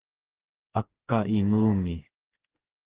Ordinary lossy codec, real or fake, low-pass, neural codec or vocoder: Opus, 16 kbps; fake; 3.6 kHz; codec, 16 kHz, 4 kbps, FreqCodec, smaller model